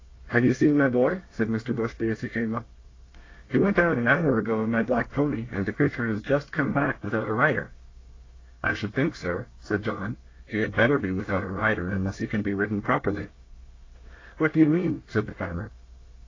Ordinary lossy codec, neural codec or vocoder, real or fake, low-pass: AAC, 32 kbps; codec, 24 kHz, 1 kbps, SNAC; fake; 7.2 kHz